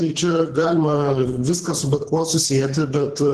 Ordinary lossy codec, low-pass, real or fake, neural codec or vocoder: Opus, 16 kbps; 10.8 kHz; fake; codec, 24 kHz, 3 kbps, HILCodec